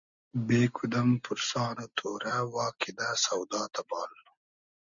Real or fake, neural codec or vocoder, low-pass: real; none; 7.2 kHz